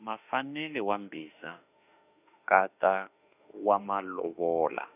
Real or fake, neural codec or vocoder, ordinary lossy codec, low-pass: fake; autoencoder, 48 kHz, 32 numbers a frame, DAC-VAE, trained on Japanese speech; none; 3.6 kHz